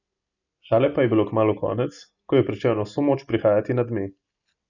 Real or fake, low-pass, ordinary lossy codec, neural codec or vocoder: real; 7.2 kHz; none; none